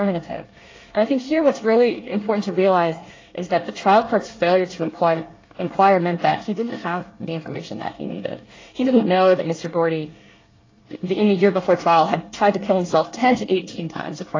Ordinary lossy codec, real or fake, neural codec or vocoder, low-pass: AAC, 32 kbps; fake; codec, 24 kHz, 1 kbps, SNAC; 7.2 kHz